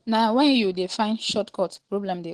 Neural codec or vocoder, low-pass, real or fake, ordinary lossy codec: none; 9.9 kHz; real; Opus, 16 kbps